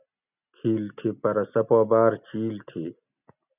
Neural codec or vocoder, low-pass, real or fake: none; 3.6 kHz; real